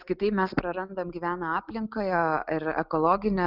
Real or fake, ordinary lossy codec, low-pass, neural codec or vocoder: real; Opus, 24 kbps; 5.4 kHz; none